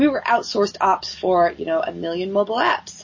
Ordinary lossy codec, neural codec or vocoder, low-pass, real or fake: MP3, 32 kbps; none; 7.2 kHz; real